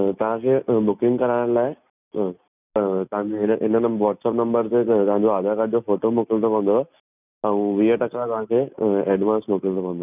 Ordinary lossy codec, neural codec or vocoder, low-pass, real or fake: none; none; 3.6 kHz; real